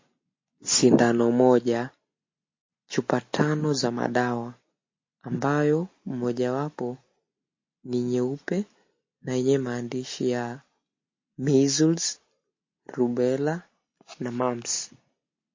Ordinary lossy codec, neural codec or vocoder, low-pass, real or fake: MP3, 32 kbps; none; 7.2 kHz; real